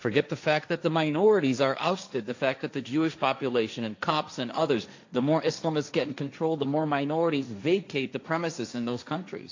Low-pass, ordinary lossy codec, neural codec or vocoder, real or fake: 7.2 kHz; AAC, 48 kbps; codec, 16 kHz, 1.1 kbps, Voila-Tokenizer; fake